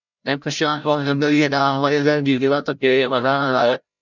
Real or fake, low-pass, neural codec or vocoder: fake; 7.2 kHz; codec, 16 kHz, 0.5 kbps, FreqCodec, larger model